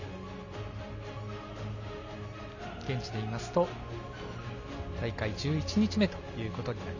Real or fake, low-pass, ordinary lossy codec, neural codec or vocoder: real; 7.2 kHz; none; none